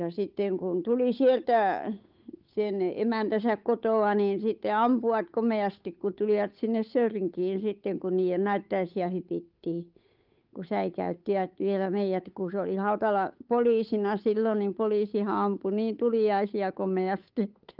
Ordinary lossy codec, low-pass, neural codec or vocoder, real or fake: Opus, 24 kbps; 5.4 kHz; codec, 16 kHz, 8 kbps, FunCodec, trained on Chinese and English, 25 frames a second; fake